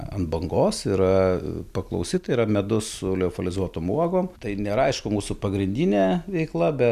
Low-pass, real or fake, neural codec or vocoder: 14.4 kHz; real; none